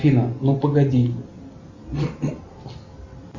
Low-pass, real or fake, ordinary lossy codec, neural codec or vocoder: 7.2 kHz; real; Opus, 64 kbps; none